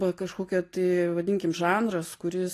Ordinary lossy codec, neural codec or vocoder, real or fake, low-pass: AAC, 48 kbps; none; real; 14.4 kHz